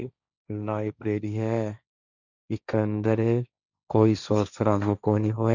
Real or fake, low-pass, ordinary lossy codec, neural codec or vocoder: fake; 7.2 kHz; none; codec, 16 kHz, 1.1 kbps, Voila-Tokenizer